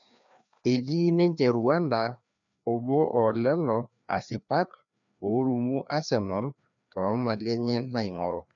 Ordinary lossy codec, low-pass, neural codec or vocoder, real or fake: none; 7.2 kHz; codec, 16 kHz, 2 kbps, FreqCodec, larger model; fake